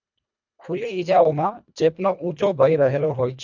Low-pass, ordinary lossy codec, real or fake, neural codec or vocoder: 7.2 kHz; none; fake; codec, 24 kHz, 1.5 kbps, HILCodec